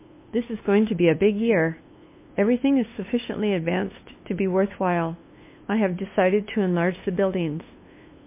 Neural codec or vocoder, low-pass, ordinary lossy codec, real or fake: codec, 16 kHz, 2 kbps, FunCodec, trained on LibriTTS, 25 frames a second; 3.6 kHz; MP3, 24 kbps; fake